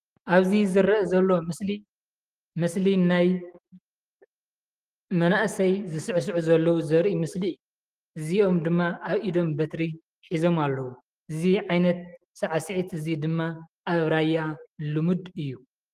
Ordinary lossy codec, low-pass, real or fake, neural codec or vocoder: Opus, 24 kbps; 14.4 kHz; fake; autoencoder, 48 kHz, 128 numbers a frame, DAC-VAE, trained on Japanese speech